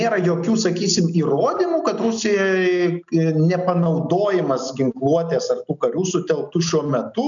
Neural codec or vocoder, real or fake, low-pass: none; real; 7.2 kHz